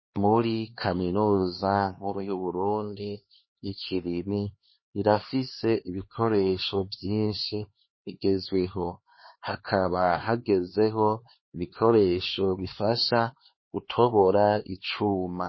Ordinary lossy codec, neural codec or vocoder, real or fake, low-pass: MP3, 24 kbps; codec, 16 kHz, 2 kbps, X-Codec, HuBERT features, trained on LibriSpeech; fake; 7.2 kHz